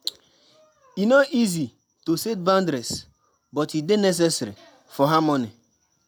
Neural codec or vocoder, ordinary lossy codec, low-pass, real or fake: none; none; none; real